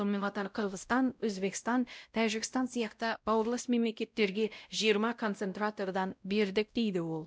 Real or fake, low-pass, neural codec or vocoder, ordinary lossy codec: fake; none; codec, 16 kHz, 0.5 kbps, X-Codec, WavLM features, trained on Multilingual LibriSpeech; none